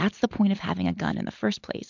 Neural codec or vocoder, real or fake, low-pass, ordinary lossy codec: none; real; 7.2 kHz; MP3, 64 kbps